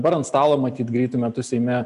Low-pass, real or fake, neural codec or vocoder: 10.8 kHz; real; none